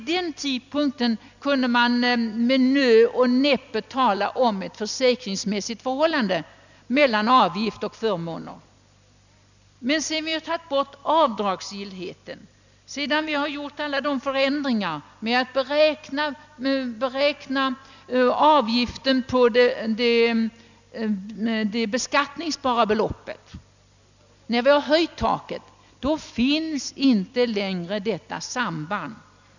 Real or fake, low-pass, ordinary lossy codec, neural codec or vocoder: real; 7.2 kHz; none; none